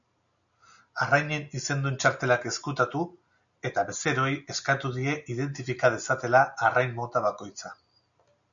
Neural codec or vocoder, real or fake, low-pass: none; real; 7.2 kHz